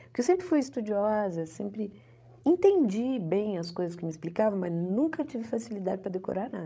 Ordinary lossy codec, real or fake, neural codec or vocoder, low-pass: none; fake; codec, 16 kHz, 16 kbps, FreqCodec, larger model; none